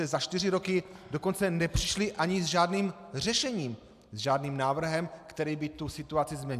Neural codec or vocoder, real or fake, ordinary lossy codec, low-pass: none; real; AAC, 96 kbps; 14.4 kHz